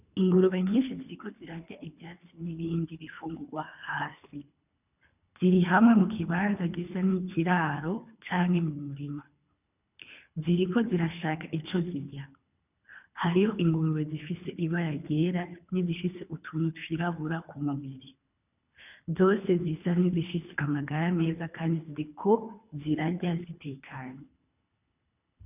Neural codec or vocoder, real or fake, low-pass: codec, 24 kHz, 3 kbps, HILCodec; fake; 3.6 kHz